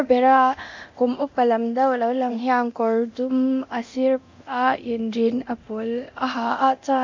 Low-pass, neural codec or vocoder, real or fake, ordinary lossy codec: 7.2 kHz; codec, 24 kHz, 0.9 kbps, DualCodec; fake; MP3, 64 kbps